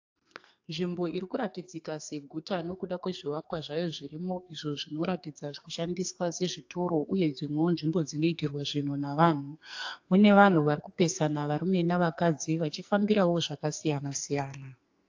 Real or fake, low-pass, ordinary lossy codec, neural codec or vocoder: fake; 7.2 kHz; AAC, 48 kbps; codec, 44.1 kHz, 2.6 kbps, SNAC